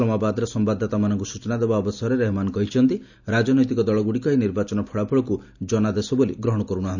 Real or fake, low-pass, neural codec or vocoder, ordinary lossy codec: real; 7.2 kHz; none; none